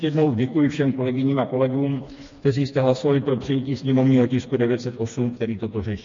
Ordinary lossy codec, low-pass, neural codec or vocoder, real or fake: MP3, 48 kbps; 7.2 kHz; codec, 16 kHz, 2 kbps, FreqCodec, smaller model; fake